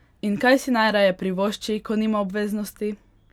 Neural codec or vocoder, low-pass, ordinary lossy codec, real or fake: none; 19.8 kHz; none; real